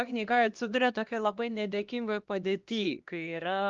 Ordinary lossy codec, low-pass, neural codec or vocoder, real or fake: Opus, 32 kbps; 7.2 kHz; codec, 16 kHz, 1 kbps, X-Codec, HuBERT features, trained on LibriSpeech; fake